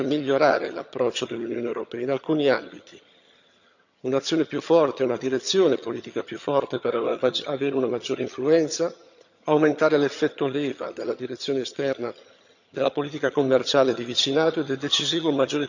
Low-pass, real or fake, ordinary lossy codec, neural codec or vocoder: 7.2 kHz; fake; none; vocoder, 22.05 kHz, 80 mel bands, HiFi-GAN